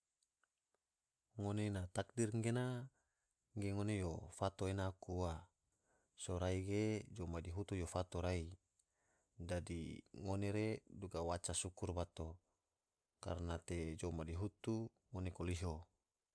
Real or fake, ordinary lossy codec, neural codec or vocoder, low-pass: real; none; none; none